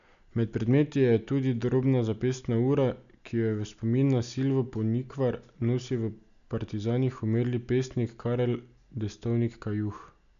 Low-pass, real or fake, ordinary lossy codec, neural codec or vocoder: 7.2 kHz; real; none; none